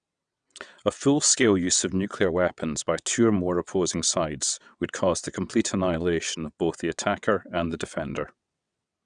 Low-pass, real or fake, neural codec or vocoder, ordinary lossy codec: 9.9 kHz; fake; vocoder, 22.05 kHz, 80 mel bands, WaveNeXt; none